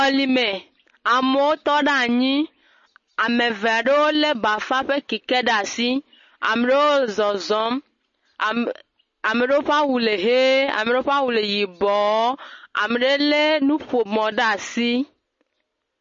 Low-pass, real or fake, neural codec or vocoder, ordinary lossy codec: 7.2 kHz; real; none; MP3, 32 kbps